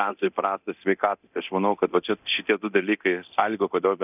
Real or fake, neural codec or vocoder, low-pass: fake; codec, 24 kHz, 0.9 kbps, DualCodec; 3.6 kHz